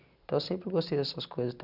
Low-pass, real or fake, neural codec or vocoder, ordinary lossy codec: 5.4 kHz; real; none; none